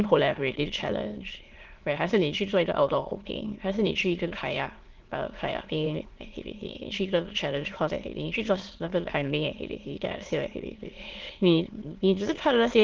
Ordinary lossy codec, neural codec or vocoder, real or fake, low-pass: Opus, 16 kbps; autoencoder, 22.05 kHz, a latent of 192 numbers a frame, VITS, trained on many speakers; fake; 7.2 kHz